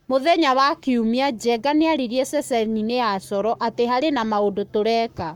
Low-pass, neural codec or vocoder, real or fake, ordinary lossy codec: 19.8 kHz; codec, 44.1 kHz, 7.8 kbps, Pupu-Codec; fake; MP3, 96 kbps